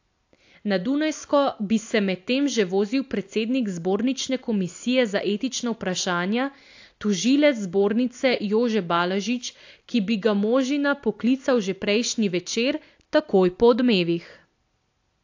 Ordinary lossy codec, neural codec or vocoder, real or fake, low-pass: AAC, 48 kbps; none; real; 7.2 kHz